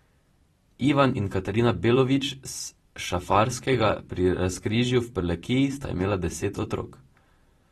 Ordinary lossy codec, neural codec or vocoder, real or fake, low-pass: AAC, 32 kbps; vocoder, 44.1 kHz, 128 mel bands every 256 samples, BigVGAN v2; fake; 19.8 kHz